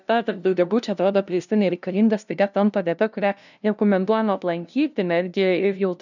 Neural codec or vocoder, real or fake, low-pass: codec, 16 kHz, 0.5 kbps, FunCodec, trained on LibriTTS, 25 frames a second; fake; 7.2 kHz